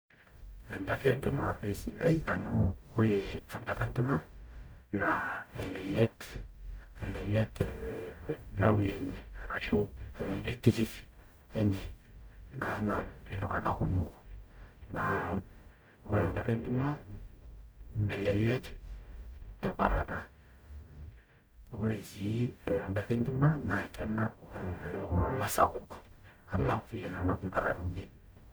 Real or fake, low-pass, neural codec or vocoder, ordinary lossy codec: fake; none; codec, 44.1 kHz, 0.9 kbps, DAC; none